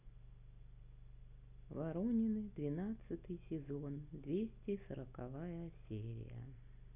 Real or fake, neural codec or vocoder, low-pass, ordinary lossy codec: real; none; 3.6 kHz; none